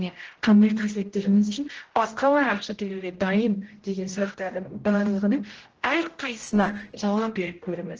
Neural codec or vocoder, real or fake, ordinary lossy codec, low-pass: codec, 16 kHz, 0.5 kbps, X-Codec, HuBERT features, trained on general audio; fake; Opus, 16 kbps; 7.2 kHz